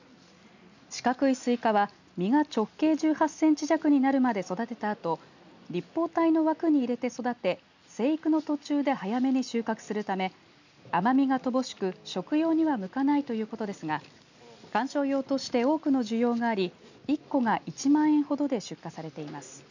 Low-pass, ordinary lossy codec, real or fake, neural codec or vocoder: 7.2 kHz; none; real; none